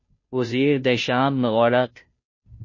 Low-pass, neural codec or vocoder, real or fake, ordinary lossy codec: 7.2 kHz; codec, 16 kHz, 0.5 kbps, FunCodec, trained on Chinese and English, 25 frames a second; fake; MP3, 32 kbps